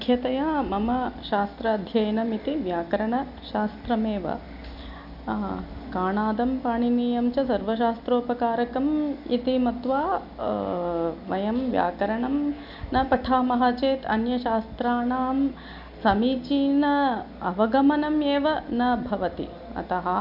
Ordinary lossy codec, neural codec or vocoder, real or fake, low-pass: none; none; real; 5.4 kHz